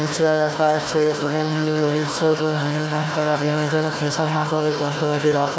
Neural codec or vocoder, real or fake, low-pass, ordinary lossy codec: codec, 16 kHz, 1 kbps, FunCodec, trained on Chinese and English, 50 frames a second; fake; none; none